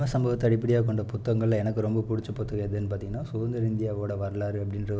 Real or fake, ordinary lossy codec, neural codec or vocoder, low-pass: real; none; none; none